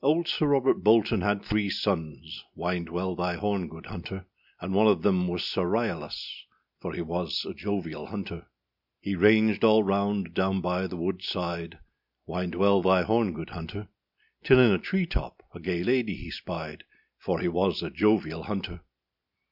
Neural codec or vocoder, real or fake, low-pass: none; real; 5.4 kHz